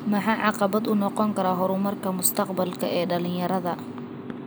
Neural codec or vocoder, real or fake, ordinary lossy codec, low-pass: none; real; none; none